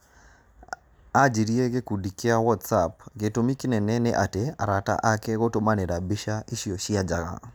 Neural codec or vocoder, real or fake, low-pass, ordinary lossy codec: none; real; none; none